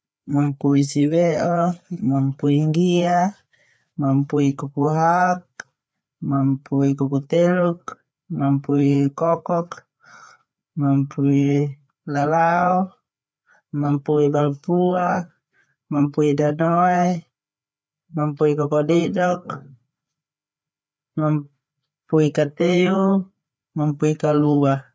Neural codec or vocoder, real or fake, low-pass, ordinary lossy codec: codec, 16 kHz, 4 kbps, FreqCodec, larger model; fake; none; none